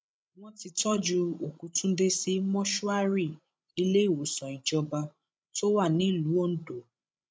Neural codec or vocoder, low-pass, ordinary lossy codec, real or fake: codec, 16 kHz, 16 kbps, FreqCodec, larger model; none; none; fake